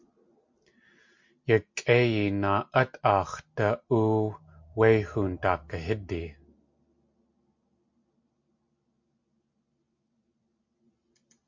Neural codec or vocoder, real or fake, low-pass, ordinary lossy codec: none; real; 7.2 kHz; MP3, 48 kbps